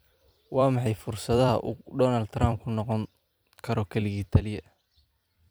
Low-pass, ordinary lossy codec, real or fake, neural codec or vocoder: none; none; fake; vocoder, 44.1 kHz, 128 mel bands every 256 samples, BigVGAN v2